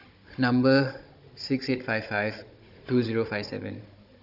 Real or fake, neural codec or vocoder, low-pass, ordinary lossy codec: fake; codec, 16 kHz, 16 kbps, FunCodec, trained on Chinese and English, 50 frames a second; 5.4 kHz; AAC, 48 kbps